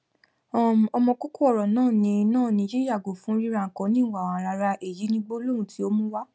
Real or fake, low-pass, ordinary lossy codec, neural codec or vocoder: real; none; none; none